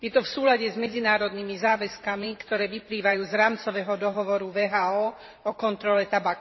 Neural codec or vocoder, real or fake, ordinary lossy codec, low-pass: vocoder, 44.1 kHz, 128 mel bands every 512 samples, BigVGAN v2; fake; MP3, 24 kbps; 7.2 kHz